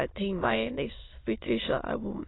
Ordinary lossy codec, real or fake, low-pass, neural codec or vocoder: AAC, 16 kbps; fake; 7.2 kHz; autoencoder, 22.05 kHz, a latent of 192 numbers a frame, VITS, trained on many speakers